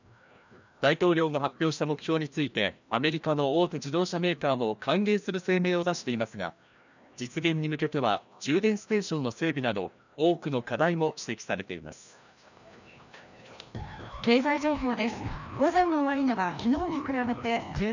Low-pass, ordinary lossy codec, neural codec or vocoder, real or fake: 7.2 kHz; none; codec, 16 kHz, 1 kbps, FreqCodec, larger model; fake